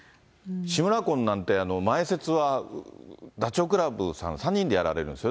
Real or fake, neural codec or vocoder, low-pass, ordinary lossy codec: real; none; none; none